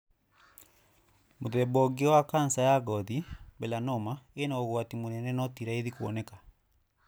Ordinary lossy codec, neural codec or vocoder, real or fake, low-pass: none; none; real; none